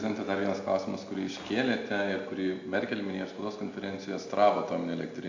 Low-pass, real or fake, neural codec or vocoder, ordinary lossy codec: 7.2 kHz; real; none; AAC, 48 kbps